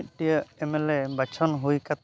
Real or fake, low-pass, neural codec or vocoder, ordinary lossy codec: real; none; none; none